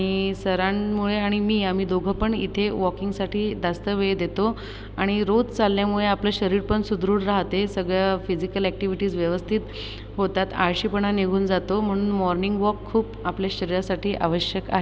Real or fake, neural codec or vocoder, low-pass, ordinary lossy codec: real; none; none; none